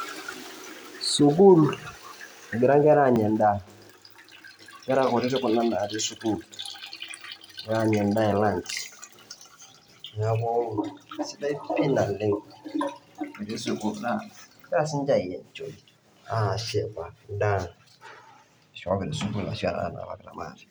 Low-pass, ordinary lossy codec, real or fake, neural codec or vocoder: none; none; real; none